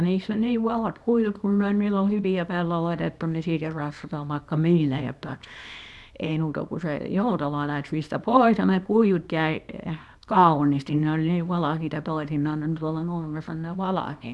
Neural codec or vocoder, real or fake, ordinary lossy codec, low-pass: codec, 24 kHz, 0.9 kbps, WavTokenizer, small release; fake; none; none